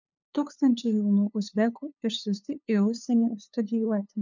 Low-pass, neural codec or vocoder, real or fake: 7.2 kHz; codec, 16 kHz, 2 kbps, FunCodec, trained on LibriTTS, 25 frames a second; fake